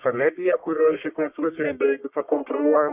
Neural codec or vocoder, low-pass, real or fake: codec, 44.1 kHz, 1.7 kbps, Pupu-Codec; 3.6 kHz; fake